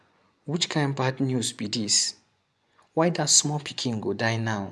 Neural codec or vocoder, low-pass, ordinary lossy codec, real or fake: vocoder, 24 kHz, 100 mel bands, Vocos; none; none; fake